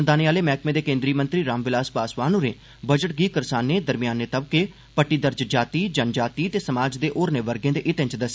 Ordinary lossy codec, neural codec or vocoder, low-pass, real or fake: none; none; none; real